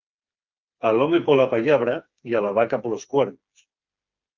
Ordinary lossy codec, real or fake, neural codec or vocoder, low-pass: Opus, 32 kbps; fake; codec, 16 kHz, 4 kbps, FreqCodec, smaller model; 7.2 kHz